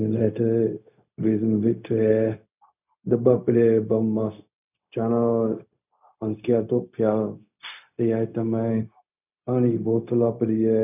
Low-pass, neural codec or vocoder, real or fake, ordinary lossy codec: 3.6 kHz; codec, 16 kHz, 0.4 kbps, LongCat-Audio-Codec; fake; none